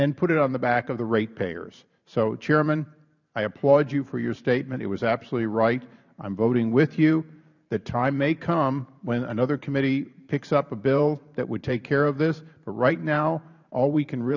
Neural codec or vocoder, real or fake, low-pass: vocoder, 44.1 kHz, 128 mel bands every 512 samples, BigVGAN v2; fake; 7.2 kHz